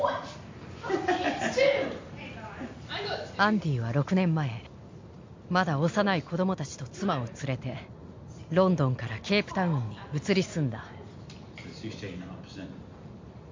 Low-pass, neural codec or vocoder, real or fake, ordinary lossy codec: 7.2 kHz; none; real; MP3, 48 kbps